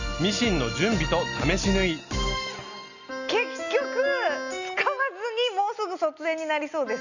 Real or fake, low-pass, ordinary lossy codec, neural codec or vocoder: real; 7.2 kHz; none; none